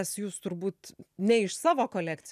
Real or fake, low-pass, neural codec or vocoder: real; 14.4 kHz; none